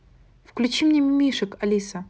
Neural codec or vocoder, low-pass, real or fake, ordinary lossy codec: none; none; real; none